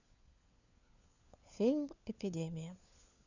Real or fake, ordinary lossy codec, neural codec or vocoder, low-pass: fake; none; codec, 16 kHz, 4 kbps, FunCodec, trained on LibriTTS, 50 frames a second; 7.2 kHz